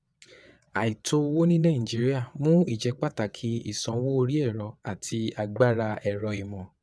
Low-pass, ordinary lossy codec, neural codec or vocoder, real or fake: none; none; vocoder, 22.05 kHz, 80 mel bands, WaveNeXt; fake